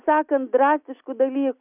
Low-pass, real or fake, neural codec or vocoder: 3.6 kHz; real; none